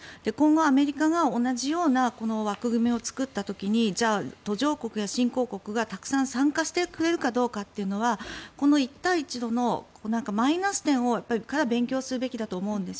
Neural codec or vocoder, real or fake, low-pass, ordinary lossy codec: none; real; none; none